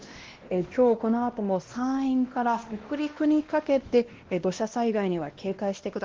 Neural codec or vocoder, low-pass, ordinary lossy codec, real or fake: codec, 16 kHz, 1 kbps, X-Codec, WavLM features, trained on Multilingual LibriSpeech; 7.2 kHz; Opus, 16 kbps; fake